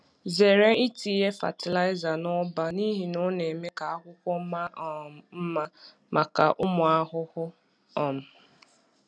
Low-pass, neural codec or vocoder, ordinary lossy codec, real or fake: none; none; none; real